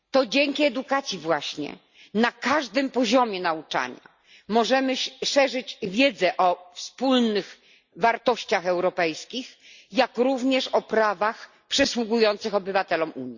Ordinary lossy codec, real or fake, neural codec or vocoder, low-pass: Opus, 64 kbps; real; none; 7.2 kHz